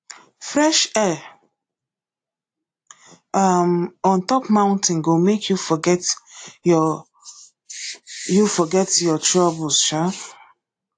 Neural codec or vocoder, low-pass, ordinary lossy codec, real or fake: none; 9.9 kHz; AAC, 48 kbps; real